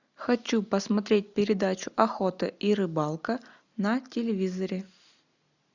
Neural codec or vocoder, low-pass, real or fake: none; 7.2 kHz; real